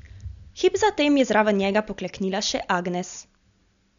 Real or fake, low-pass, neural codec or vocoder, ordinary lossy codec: real; 7.2 kHz; none; none